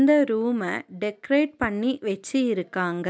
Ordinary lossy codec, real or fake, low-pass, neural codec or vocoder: none; real; none; none